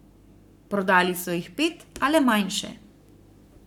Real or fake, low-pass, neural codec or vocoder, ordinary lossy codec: fake; 19.8 kHz; codec, 44.1 kHz, 7.8 kbps, Pupu-Codec; none